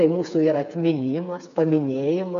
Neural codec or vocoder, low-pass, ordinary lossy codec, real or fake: codec, 16 kHz, 4 kbps, FreqCodec, smaller model; 7.2 kHz; MP3, 64 kbps; fake